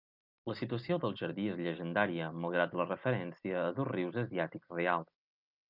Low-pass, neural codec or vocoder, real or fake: 5.4 kHz; none; real